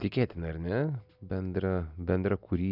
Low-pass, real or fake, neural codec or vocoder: 5.4 kHz; real; none